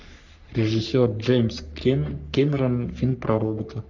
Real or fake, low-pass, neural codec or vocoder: fake; 7.2 kHz; codec, 44.1 kHz, 3.4 kbps, Pupu-Codec